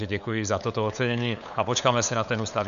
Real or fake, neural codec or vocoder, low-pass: fake; codec, 16 kHz, 8 kbps, FunCodec, trained on LibriTTS, 25 frames a second; 7.2 kHz